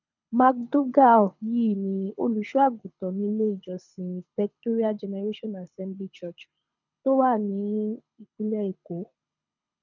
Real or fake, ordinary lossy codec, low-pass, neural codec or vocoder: fake; none; 7.2 kHz; codec, 24 kHz, 6 kbps, HILCodec